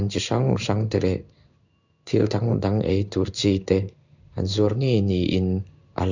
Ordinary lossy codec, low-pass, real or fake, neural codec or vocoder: none; 7.2 kHz; fake; codec, 16 kHz in and 24 kHz out, 1 kbps, XY-Tokenizer